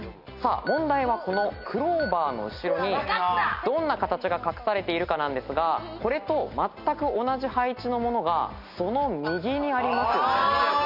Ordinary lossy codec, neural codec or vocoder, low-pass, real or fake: none; none; 5.4 kHz; real